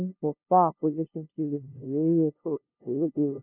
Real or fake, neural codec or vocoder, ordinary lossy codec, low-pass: fake; codec, 16 kHz, 0.5 kbps, FunCodec, trained on LibriTTS, 25 frames a second; none; 3.6 kHz